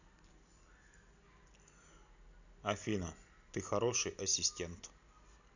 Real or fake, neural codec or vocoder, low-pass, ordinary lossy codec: real; none; 7.2 kHz; none